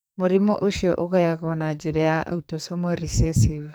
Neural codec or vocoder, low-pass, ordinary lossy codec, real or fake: codec, 44.1 kHz, 2.6 kbps, SNAC; none; none; fake